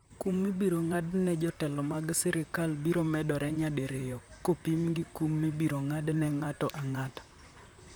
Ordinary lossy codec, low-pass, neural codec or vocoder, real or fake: none; none; vocoder, 44.1 kHz, 128 mel bands, Pupu-Vocoder; fake